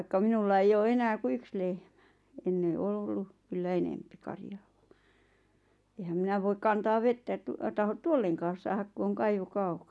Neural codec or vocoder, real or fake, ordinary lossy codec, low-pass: none; real; none; none